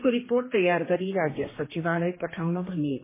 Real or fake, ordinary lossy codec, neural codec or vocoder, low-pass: fake; MP3, 16 kbps; codec, 16 kHz, 2 kbps, X-Codec, HuBERT features, trained on general audio; 3.6 kHz